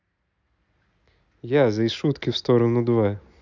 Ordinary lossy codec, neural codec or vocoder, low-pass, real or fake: none; none; 7.2 kHz; real